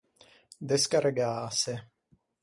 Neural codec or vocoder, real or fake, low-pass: none; real; 10.8 kHz